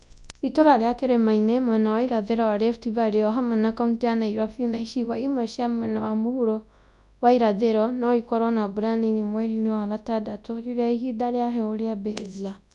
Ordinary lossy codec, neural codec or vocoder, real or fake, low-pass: none; codec, 24 kHz, 0.9 kbps, WavTokenizer, large speech release; fake; 10.8 kHz